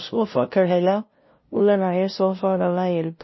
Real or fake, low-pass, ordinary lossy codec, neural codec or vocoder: fake; 7.2 kHz; MP3, 24 kbps; codec, 16 kHz, 0.5 kbps, FunCodec, trained on LibriTTS, 25 frames a second